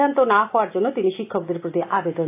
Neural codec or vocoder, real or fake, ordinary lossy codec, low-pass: none; real; AAC, 24 kbps; 3.6 kHz